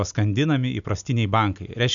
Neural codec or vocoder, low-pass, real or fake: none; 7.2 kHz; real